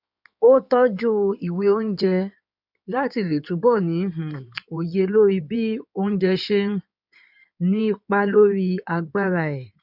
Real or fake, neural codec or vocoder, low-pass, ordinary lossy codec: fake; codec, 16 kHz in and 24 kHz out, 2.2 kbps, FireRedTTS-2 codec; 5.4 kHz; none